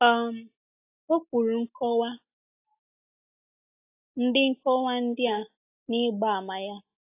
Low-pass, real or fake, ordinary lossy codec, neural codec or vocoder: 3.6 kHz; real; AAC, 24 kbps; none